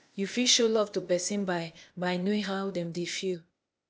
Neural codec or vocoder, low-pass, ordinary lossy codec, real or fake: codec, 16 kHz, 0.8 kbps, ZipCodec; none; none; fake